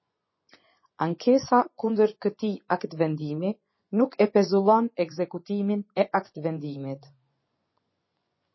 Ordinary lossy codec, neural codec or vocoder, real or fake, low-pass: MP3, 24 kbps; vocoder, 24 kHz, 100 mel bands, Vocos; fake; 7.2 kHz